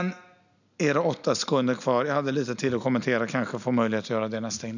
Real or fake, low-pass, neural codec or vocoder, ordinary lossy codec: real; 7.2 kHz; none; none